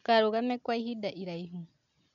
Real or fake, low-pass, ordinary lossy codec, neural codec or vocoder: real; 7.2 kHz; MP3, 96 kbps; none